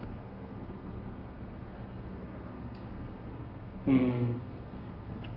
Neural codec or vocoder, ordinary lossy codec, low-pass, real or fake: codec, 32 kHz, 1.9 kbps, SNAC; Opus, 32 kbps; 5.4 kHz; fake